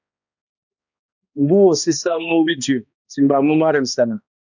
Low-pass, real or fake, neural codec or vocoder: 7.2 kHz; fake; codec, 16 kHz, 1 kbps, X-Codec, HuBERT features, trained on balanced general audio